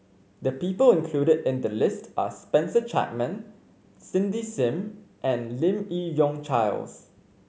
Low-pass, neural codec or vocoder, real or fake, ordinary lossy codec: none; none; real; none